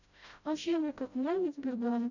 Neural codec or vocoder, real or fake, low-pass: codec, 16 kHz, 0.5 kbps, FreqCodec, smaller model; fake; 7.2 kHz